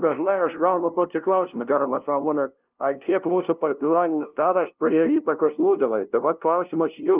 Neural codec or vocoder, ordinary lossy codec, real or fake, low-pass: codec, 16 kHz, 0.5 kbps, FunCodec, trained on LibriTTS, 25 frames a second; Opus, 32 kbps; fake; 3.6 kHz